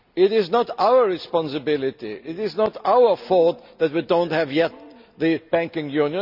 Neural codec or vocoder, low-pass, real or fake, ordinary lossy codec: none; 5.4 kHz; real; none